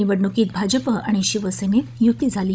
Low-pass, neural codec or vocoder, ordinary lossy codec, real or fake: none; codec, 16 kHz, 16 kbps, FunCodec, trained on Chinese and English, 50 frames a second; none; fake